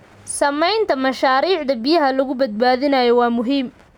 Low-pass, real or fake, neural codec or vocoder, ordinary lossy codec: 19.8 kHz; real; none; none